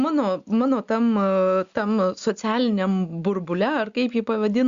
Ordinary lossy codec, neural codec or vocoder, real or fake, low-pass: Opus, 64 kbps; none; real; 7.2 kHz